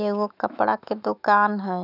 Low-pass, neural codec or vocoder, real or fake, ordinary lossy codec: 5.4 kHz; autoencoder, 48 kHz, 128 numbers a frame, DAC-VAE, trained on Japanese speech; fake; none